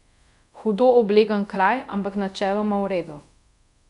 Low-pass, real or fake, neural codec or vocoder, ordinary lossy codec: 10.8 kHz; fake; codec, 24 kHz, 0.5 kbps, DualCodec; none